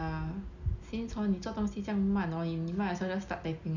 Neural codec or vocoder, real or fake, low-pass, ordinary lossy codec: none; real; 7.2 kHz; none